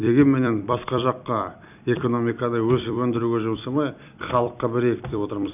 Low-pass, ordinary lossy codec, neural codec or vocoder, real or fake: 3.6 kHz; none; vocoder, 44.1 kHz, 128 mel bands every 256 samples, BigVGAN v2; fake